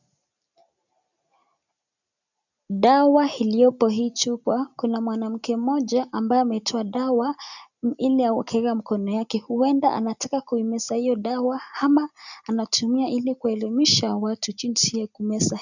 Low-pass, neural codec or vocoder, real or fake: 7.2 kHz; none; real